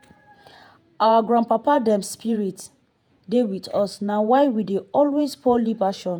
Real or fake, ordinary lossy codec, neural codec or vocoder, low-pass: fake; none; vocoder, 48 kHz, 128 mel bands, Vocos; none